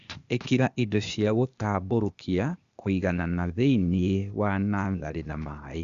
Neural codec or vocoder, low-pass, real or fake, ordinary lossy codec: codec, 16 kHz, 0.8 kbps, ZipCodec; 7.2 kHz; fake; Opus, 64 kbps